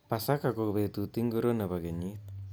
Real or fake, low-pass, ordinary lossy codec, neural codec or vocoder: real; none; none; none